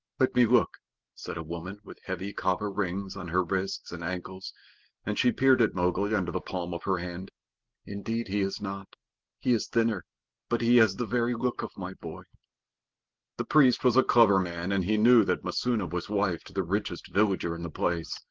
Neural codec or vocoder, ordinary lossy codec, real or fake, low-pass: none; Opus, 16 kbps; real; 7.2 kHz